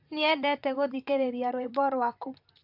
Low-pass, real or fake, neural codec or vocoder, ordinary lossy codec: 5.4 kHz; fake; vocoder, 44.1 kHz, 128 mel bands, Pupu-Vocoder; AAC, 48 kbps